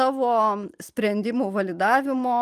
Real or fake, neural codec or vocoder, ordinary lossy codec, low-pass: real; none; Opus, 32 kbps; 14.4 kHz